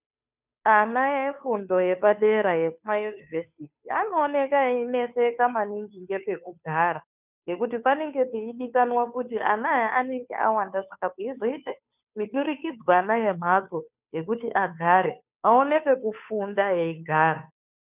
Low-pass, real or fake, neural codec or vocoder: 3.6 kHz; fake; codec, 16 kHz, 2 kbps, FunCodec, trained on Chinese and English, 25 frames a second